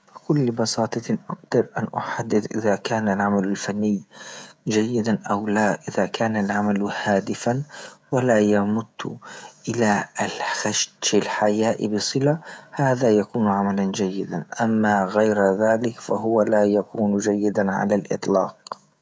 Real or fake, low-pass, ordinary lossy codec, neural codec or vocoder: fake; none; none; codec, 16 kHz, 16 kbps, FreqCodec, smaller model